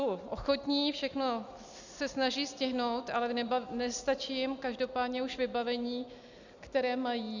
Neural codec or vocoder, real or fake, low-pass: none; real; 7.2 kHz